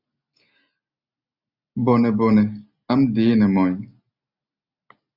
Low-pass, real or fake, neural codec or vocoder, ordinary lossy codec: 5.4 kHz; real; none; AAC, 48 kbps